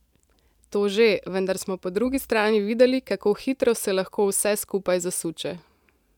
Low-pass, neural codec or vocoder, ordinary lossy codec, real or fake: 19.8 kHz; vocoder, 44.1 kHz, 128 mel bands every 512 samples, BigVGAN v2; none; fake